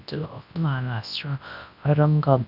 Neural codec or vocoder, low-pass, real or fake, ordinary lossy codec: codec, 24 kHz, 0.9 kbps, WavTokenizer, large speech release; 5.4 kHz; fake; none